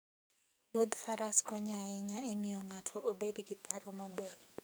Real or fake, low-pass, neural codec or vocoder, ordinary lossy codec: fake; none; codec, 44.1 kHz, 2.6 kbps, SNAC; none